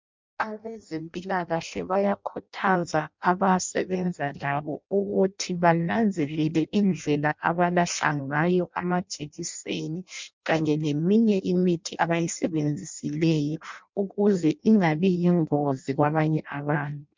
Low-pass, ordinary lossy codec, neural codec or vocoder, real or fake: 7.2 kHz; MP3, 64 kbps; codec, 16 kHz in and 24 kHz out, 0.6 kbps, FireRedTTS-2 codec; fake